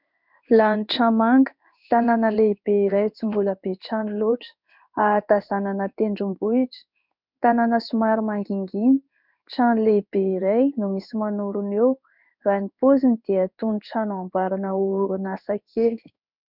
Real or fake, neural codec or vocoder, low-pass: fake; codec, 16 kHz in and 24 kHz out, 1 kbps, XY-Tokenizer; 5.4 kHz